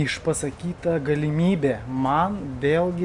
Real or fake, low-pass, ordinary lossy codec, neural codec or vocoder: real; 10.8 kHz; Opus, 64 kbps; none